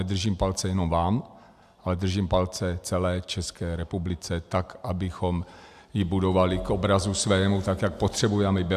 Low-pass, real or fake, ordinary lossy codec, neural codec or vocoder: 14.4 kHz; real; AAC, 96 kbps; none